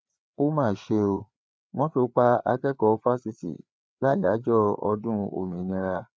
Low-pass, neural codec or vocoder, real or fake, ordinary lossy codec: none; codec, 16 kHz, 4 kbps, FreqCodec, larger model; fake; none